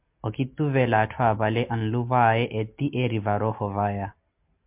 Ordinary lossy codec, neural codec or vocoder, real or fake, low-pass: MP3, 32 kbps; none; real; 3.6 kHz